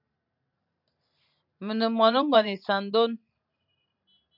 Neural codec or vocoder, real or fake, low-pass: vocoder, 44.1 kHz, 128 mel bands every 512 samples, BigVGAN v2; fake; 5.4 kHz